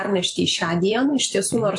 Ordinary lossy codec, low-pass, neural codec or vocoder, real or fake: AAC, 64 kbps; 10.8 kHz; vocoder, 44.1 kHz, 128 mel bands every 512 samples, BigVGAN v2; fake